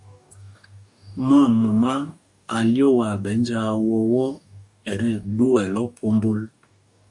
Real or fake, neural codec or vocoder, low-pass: fake; codec, 44.1 kHz, 2.6 kbps, DAC; 10.8 kHz